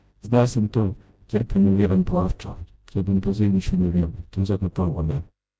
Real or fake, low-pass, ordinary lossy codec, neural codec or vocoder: fake; none; none; codec, 16 kHz, 0.5 kbps, FreqCodec, smaller model